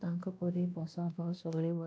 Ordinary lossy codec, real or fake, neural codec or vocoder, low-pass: none; fake; codec, 16 kHz, 1 kbps, X-Codec, WavLM features, trained on Multilingual LibriSpeech; none